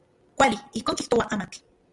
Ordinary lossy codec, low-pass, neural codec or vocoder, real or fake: Opus, 64 kbps; 10.8 kHz; none; real